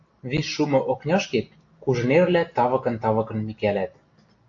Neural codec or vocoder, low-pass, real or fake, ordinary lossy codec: none; 7.2 kHz; real; AAC, 48 kbps